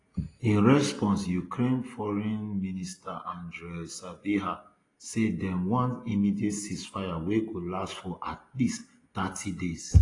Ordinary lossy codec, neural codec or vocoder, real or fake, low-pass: AAC, 32 kbps; none; real; 10.8 kHz